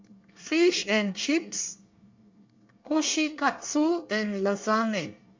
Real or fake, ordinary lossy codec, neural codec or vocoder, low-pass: fake; MP3, 64 kbps; codec, 24 kHz, 1 kbps, SNAC; 7.2 kHz